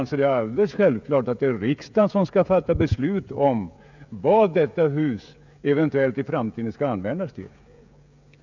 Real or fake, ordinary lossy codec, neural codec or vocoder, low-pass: fake; none; codec, 16 kHz, 16 kbps, FreqCodec, smaller model; 7.2 kHz